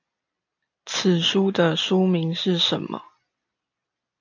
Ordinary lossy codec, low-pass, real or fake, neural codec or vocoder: AAC, 48 kbps; 7.2 kHz; real; none